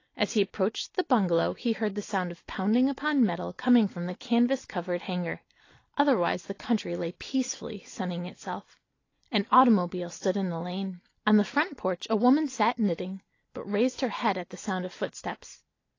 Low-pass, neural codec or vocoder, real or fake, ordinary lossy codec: 7.2 kHz; none; real; AAC, 32 kbps